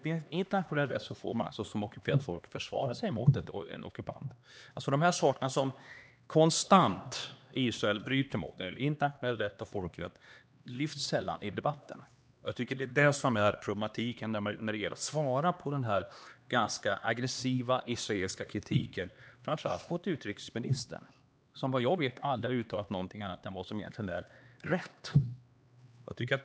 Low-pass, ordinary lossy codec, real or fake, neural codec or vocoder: none; none; fake; codec, 16 kHz, 2 kbps, X-Codec, HuBERT features, trained on LibriSpeech